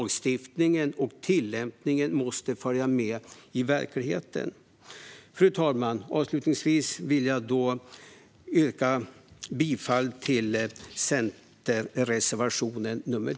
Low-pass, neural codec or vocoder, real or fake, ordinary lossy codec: none; none; real; none